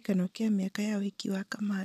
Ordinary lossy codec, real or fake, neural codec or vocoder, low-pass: none; real; none; 14.4 kHz